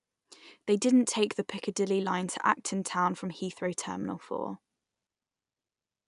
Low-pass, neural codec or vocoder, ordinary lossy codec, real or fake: 10.8 kHz; none; none; real